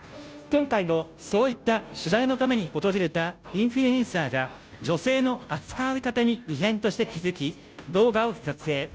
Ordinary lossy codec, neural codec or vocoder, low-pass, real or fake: none; codec, 16 kHz, 0.5 kbps, FunCodec, trained on Chinese and English, 25 frames a second; none; fake